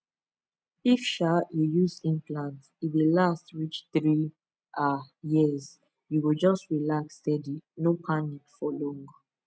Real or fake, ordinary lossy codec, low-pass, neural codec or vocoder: real; none; none; none